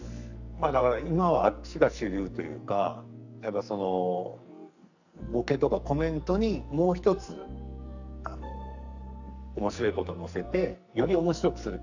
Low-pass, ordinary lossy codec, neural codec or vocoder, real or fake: 7.2 kHz; none; codec, 44.1 kHz, 2.6 kbps, SNAC; fake